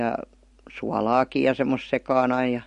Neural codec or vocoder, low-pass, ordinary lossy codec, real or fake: none; 10.8 kHz; MP3, 48 kbps; real